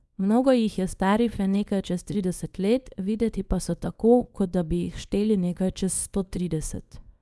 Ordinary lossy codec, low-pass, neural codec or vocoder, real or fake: none; none; codec, 24 kHz, 0.9 kbps, WavTokenizer, small release; fake